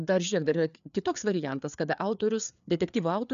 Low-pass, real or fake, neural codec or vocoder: 7.2 kHz; fake; codec, 16 kHz, 4 kbps, FreqCodec, larger model